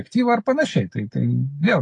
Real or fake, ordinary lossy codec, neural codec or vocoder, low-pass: real; AAC, 32 kbps; none; 10.8 kHz